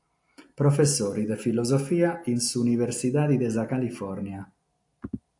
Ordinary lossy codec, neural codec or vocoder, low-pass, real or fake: AAC, 64 kbps; none; 10.8 kHz; real